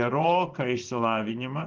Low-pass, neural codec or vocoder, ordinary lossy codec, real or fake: 7.2 kHz; vocoder, 24 kHz, 100 mel bands, Vocos; Opus, 16 kbps; fake